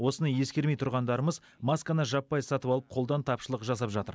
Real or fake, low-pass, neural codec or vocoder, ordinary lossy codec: real; none; none; none